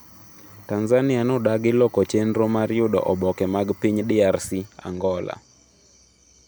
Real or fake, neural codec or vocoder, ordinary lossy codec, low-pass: real; none; none; none